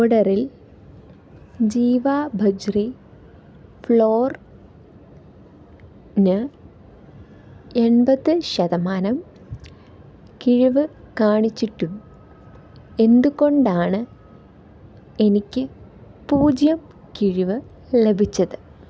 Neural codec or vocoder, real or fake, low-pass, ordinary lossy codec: none; real; none; none